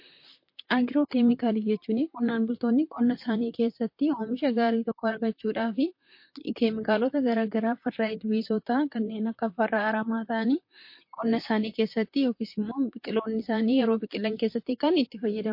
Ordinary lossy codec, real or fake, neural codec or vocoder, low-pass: MP3, 32 kbps; fake; vocoder, 44.1 kHz, 128 mel bands, Pupu-Vocoder; 5.4 kHz